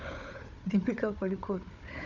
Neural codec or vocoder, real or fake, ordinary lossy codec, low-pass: codec, 16 kHz, 4 kbps, FunCodec, trained on Chinese and English, 50 frames a second; fake; none; 7.2 kHz